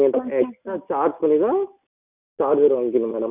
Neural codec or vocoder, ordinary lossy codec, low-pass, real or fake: none; none; 3.6 kHz; real